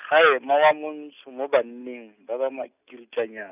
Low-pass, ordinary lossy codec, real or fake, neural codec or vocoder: 3.6 kHz; none; real; none